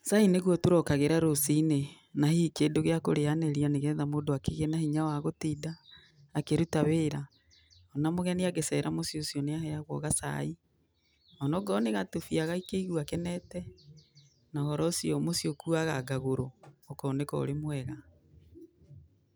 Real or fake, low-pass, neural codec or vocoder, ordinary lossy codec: real; none; none; none